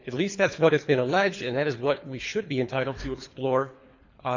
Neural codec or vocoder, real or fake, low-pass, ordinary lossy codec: codec, 24 kHz, 3 kbps, HILCodec; fake; 7.2 kHz; MP3, 48 kbps